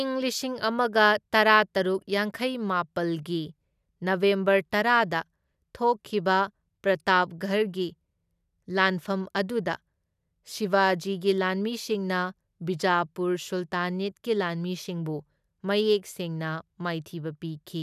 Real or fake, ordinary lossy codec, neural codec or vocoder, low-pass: real; none; none; 14.4 kHz